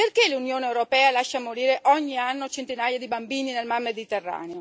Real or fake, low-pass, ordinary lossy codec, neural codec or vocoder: real; none; none; none